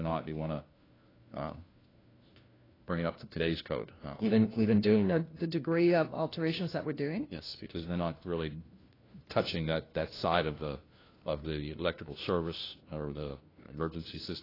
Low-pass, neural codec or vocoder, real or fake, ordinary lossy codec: 5.4 kHz; codec, 16 kHz, 1 kbps, FunCodec, trained on LibriTTS, 50 frames a second; fake; AAC, 24 kbps